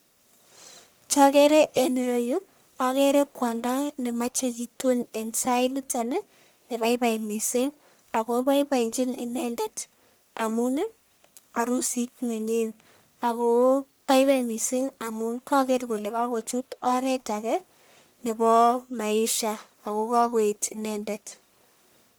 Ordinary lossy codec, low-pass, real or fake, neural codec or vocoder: none; none; fake; codec, 44.1 kHz, 1.7 kbps, Pupu-Codec